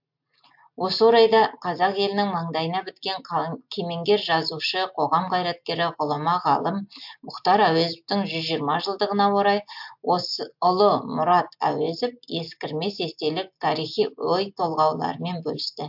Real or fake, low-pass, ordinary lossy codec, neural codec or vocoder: real; 5.4 kHz; MP3, 48 kbps; none